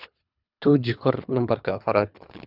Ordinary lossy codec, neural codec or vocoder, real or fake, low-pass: none; codec, 24 kHz, 3 kbps, HILCodec; fake; 5.4 kHz